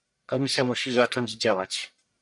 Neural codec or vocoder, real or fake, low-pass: codec, 44.1 kHz, 1.7 kbps, Pupu-Codec; fake; 10.8 kHz